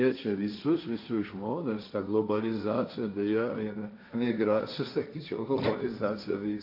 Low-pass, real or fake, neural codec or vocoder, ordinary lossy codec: 5.4 kHz; fake; codec, 16 kHz, 1.1 kbps, Voila-Tokenizer; AAC, 48 kbps